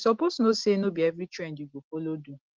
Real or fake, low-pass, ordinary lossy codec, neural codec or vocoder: real; 7.2 kHz; Opus, 16 kbps; none